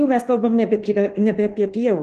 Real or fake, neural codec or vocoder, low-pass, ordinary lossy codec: fake; codec, 24 kHz, 1.2 kbps, DualCodec; 10.8 kHz; Opus, 16 kbps